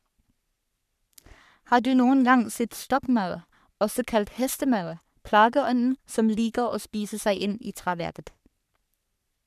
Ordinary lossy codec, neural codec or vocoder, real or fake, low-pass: none; codec, 44.1 kHz, 3.4 kbps, Pupu-Codec; fake; 14.4 kHz